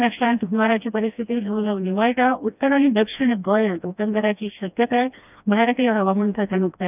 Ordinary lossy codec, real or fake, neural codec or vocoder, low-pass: none; fake; codec, 16 kHz, 1 kbps, FreqCodec, smaller model; 3.6 kHz